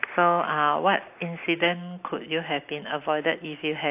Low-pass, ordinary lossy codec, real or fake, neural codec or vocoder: 3.6 kHz; AAC, 32 kbps; real; none